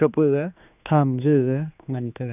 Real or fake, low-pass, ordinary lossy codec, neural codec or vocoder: fake; 3.6 kHz; none; codec, 16 kHz, 2 kbps, X-Codec, HuBERT features, trained on general audio